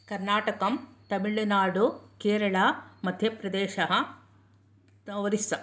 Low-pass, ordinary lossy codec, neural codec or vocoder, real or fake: none; none; none; real